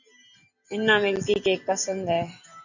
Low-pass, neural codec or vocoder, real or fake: 7.2 kHz; none; real